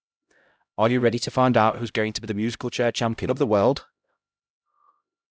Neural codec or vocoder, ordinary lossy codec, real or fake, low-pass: codec, 16 kHz, 0.5 kbps, X-Codec, HuBERT features, trained on LibriSpeech; none; fake; none